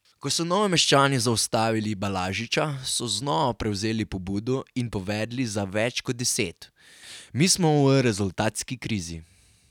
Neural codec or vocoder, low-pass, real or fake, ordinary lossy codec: none; 19.8 kHz; real; none